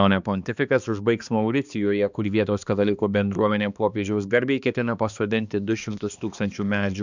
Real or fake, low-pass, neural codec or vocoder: fake; 7.2 kHz; codec, 16 kHz, 2 kbps, X-Codec, HuBERT features, trained on balanced general audio